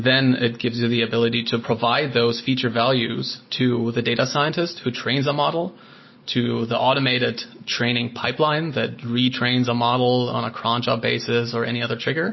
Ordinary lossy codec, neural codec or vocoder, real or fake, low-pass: MP3, 24 kbps; none; real; 7.2 kHz